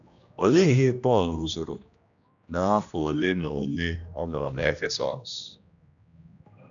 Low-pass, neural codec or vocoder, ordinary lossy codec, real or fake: 7.2 kHz; codec, 16 kHz, 1 kbps, X-Codec, HuBERT features, trained on general audio; none; fake